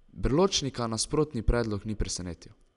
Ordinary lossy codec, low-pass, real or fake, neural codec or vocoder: none; 10.8 kHz; real; none